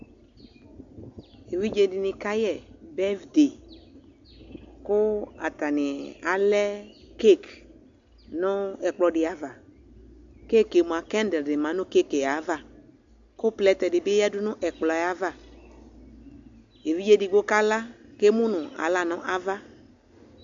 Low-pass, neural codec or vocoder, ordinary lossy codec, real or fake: 7.2 kHz; none; AAC, 64 kbps; real